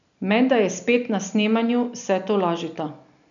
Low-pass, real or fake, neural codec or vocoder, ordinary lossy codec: 7.2 kHz; real; none; none